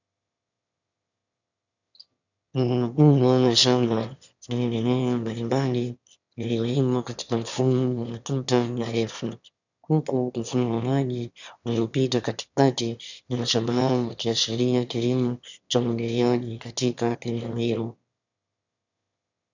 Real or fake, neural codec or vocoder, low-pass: fake; autoencoder, 22.05 kHz, a latent of 192 numbers a frame, VITS, trained on one speaker; 7.2 kHz